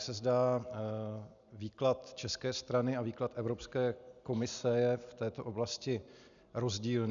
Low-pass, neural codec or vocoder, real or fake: 7.2 kHz; none; real